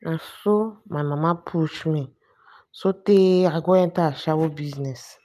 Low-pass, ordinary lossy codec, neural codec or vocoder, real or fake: 14.4 kHz; none; none; real